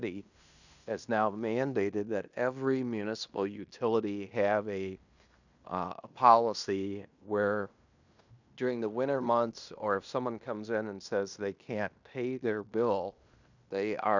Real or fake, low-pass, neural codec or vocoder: fake; 7.2 kHz; codec, 16 kHz in and 24 kHz out, 0.9 kbps, LongCat-Audio-Codec, fine tuned four codebook decoder